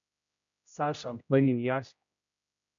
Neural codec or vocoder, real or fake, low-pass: codec, 16 kHz, 0.5 kbps, X-Codec, HuBERT features, trained on general audio; fake; 7.2 kHz